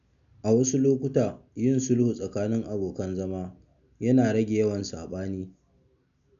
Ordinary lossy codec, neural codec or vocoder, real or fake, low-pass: AAC, 96 kbps; none; real; 7.2 kHz